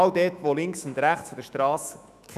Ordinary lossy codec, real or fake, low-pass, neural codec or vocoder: none; fake; 14.4 kHz; autoencoder, 48 kHz, 128 numbers a frame, DAC-VAE, trained on Japanese speech